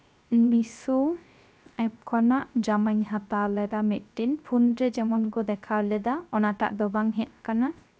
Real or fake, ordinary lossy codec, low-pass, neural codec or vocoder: fake; none; none; codec, 16 kHz, 0.3 kbps, FocalCodec